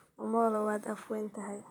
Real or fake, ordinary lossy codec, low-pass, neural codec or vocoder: fake; none; none; vocoder, 44.1 kHz, 128 mel bands, Pupu-Vocoder